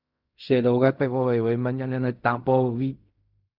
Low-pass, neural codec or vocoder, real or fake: 5.4 kHz; codec, 16 kHz in and 24 kHz out, 0.4 kbps, LongCat-Audio-Codec, fine tuned four codebook decoder; fake